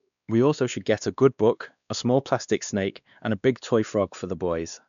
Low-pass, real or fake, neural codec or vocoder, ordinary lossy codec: 7.2 kHz; fake; codec, 16 kHz, 2 kbps, X-Codec, WavLM features, trained on Multilingual LibriSpeech; none